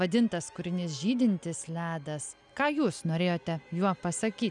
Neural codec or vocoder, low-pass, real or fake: none; 10.8 kHz; real